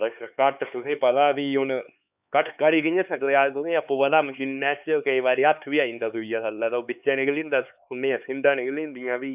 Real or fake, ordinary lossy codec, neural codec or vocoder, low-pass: fake; none; codec, 16 kHz, 4 kbps, X-Codec, HuBERT features, trained on LibriSpeech; 3.6 kHz